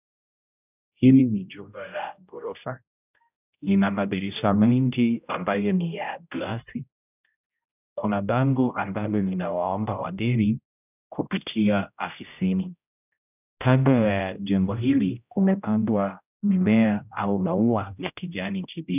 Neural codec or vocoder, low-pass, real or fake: codec, 16 kHz, 0.5 kbps, X-Codec, HuBERT features, trained on general audio; 3.6 kHz; fake